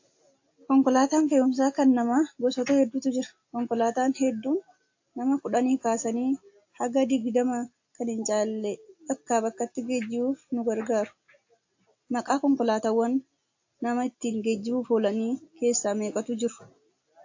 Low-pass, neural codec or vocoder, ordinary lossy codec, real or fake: 7.2 kHz; none; AAC, 48 kbps; real